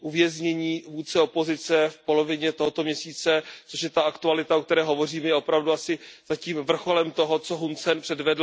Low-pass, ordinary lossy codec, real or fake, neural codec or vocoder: none; none; real; none